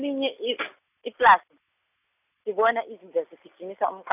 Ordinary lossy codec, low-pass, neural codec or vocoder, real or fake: none; 3.6 kHz; none; real